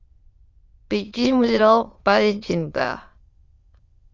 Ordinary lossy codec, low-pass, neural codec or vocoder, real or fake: Opus, 32 kbps; 7.2 kHz; autoencoder, 22.05 kHz, a latent of 192 numbers a frame, VITS, trained on many speakers; fake